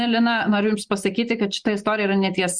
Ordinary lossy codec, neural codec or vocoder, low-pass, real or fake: Opus, 64 kbps; none; 9.9 kHz; real